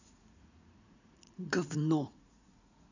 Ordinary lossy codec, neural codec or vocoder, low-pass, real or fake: none; vocoder, 44.1 kHz, 80 mel bands, Vocos; 7.2 kHz; fake